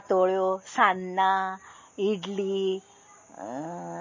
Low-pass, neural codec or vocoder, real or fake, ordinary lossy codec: 7.2 kHz; none; real; MP3, 32 kbps